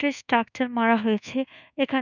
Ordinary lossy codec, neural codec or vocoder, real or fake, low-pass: none; codec, 24 kHz, 1.2 kbps, DualCodec; fake; 7.2 kHz